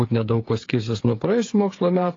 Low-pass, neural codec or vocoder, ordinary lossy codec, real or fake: 7.2 kHz; codec, 16 kHz, 8 kbps, FreqCodec, smaller model; AAC, 32 kbps; fake